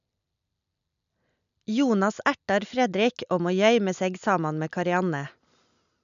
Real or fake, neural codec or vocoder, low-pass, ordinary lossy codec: real; none; 7.2 kHz; MP3, 96 kbps